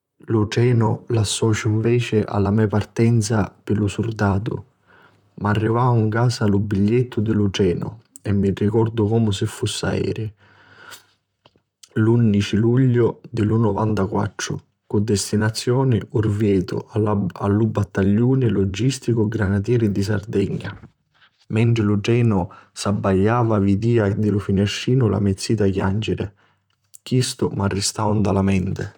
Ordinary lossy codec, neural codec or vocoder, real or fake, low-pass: none; vocoder, 44.1 kHz, 128 mel bands, Pupu-Vocoder; fake; 19.8 kHz